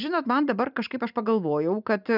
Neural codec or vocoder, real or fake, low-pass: none; real; 5.4 kHz